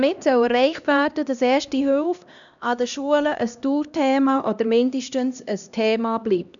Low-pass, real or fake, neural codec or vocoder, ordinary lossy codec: 7.2 kHz; fake; codec, 16 kHz, 1 kbps, X-Codec, HuBERT features, trained on LibriSpeech; none